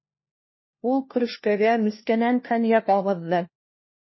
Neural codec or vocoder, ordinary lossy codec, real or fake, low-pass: codec, 16 kHz, 1 kbps, FunCodec, trained on LibriTTS, 50 frames a second; MP3, 24 kbps; fake; 7.2 kHz